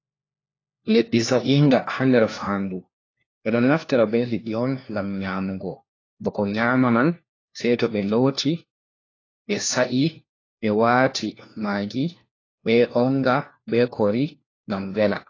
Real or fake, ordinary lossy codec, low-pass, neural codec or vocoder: fake; AAC, 32 kbps; 7.2 kHz; codec, 16 kHz, 1 kbps, FunCodec, trained on LibriTTS, 50 frames a second